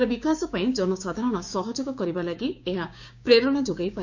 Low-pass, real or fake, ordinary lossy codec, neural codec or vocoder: 7.2 kHz; fake; none; codec, 16 kHz, 6 kbps, DAC